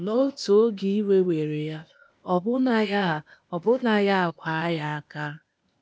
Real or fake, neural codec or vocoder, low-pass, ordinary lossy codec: fake; codec, 16 kHz, 0.8 kbps, ZipCodec; none; none